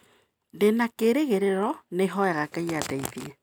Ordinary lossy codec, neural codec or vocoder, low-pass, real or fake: none; none; none; real